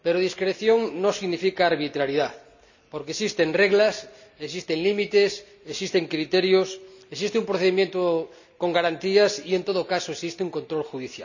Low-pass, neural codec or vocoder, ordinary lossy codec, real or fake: 7.2 kHz; none; none; real